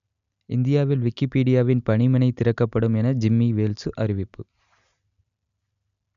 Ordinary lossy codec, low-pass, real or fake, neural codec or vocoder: none; 7.2 kHz; real; none